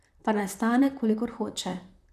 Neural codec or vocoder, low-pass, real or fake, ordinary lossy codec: vocoder, 44.1 kHz, 128 mel bands, Pupu-Vocoder; 14.4 kHz; fake; none